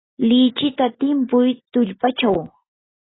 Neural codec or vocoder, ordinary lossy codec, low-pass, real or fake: none; AAC, 16 kbps; 7.2 kHz; real